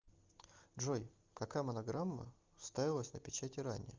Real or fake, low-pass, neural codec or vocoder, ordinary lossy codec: real; 7.2 kHz; none; Opus, 24 kbps